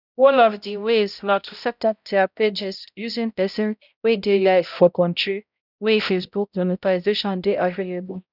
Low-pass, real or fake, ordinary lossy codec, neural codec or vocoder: 5.4 kHz; fake; none; codec, 16 kHz, 0.5 kbps, X-Codec, HuBERT features, trained on balanced general audio